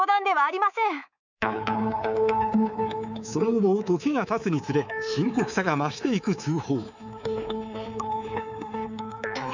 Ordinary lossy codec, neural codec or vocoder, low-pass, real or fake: none; codec, 24 kHz, 3.1 kbps, DualCodec; 7.2 kHz; fake